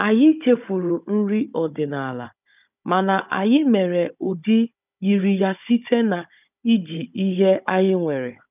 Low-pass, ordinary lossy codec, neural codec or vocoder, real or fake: 3.6 kHz; none; codec, 16 kHz, 16 kbps, FunCodec, trained on Chinese and English, 50 frames a second; fake